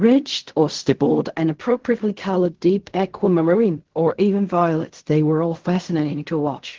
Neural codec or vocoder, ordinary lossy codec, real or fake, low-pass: codec, 16 kHz in and 24 kHz out, 0.4 kbps, LongCat-Audio-Codec, fine tuned four codebook decoder; Opus, 16 kbps; fake; 7.2 kHz